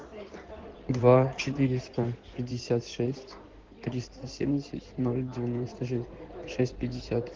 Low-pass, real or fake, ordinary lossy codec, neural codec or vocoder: 7.2 kHz; fake; Opus, 16 kbps; codec, 16 kHz in and 24 kHz out, 2.2 kbps, FireRedTTS-2 codec